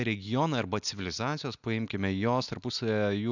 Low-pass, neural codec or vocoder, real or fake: 7.2 kHz; none; real